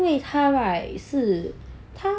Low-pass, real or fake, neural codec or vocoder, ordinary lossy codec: none; real; none; none